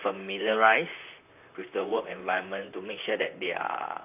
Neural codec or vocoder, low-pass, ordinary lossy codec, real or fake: vocoder, 44.1 kHz, 128 mel bands, Pupu-Vocoder; 3.6 kHz; AAC, 32 kbps; fake